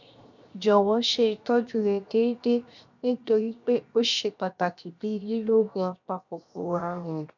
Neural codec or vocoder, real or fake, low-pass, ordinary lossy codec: codec, 16 kHz, 0.7 kbps, FocalCodec; fake; 7.2 kHz; none